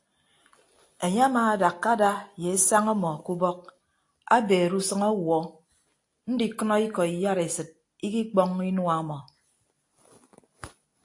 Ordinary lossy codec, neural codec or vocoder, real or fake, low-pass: AAC, 48 kbps; none; real; 10.8 kHz